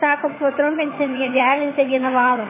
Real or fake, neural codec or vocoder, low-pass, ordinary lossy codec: fake; vocoder, 22.05 kHz, 80 mel bands, HiFi-GAN; 3.6 kHz; MP3, 16 kbps